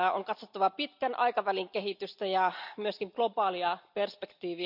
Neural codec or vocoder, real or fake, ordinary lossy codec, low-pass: none; real; none; 5.4 kHz